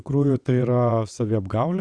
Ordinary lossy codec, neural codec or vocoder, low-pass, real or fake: Opus, 64 kbps; vocoder, 22.05 kHz, 80 mel bands, WaveNeXt; 9.9 kHz; fake